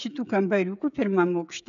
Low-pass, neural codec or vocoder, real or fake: 7.2 kHz; codec, 16 kHz, 16 kbps, FreqCodec, smaller model; fake